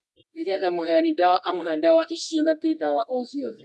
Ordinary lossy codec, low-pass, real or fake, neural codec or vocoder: none; none; fake; codec, 24 kHz, 0.9 kbps, WavTokenizer, medium music audio release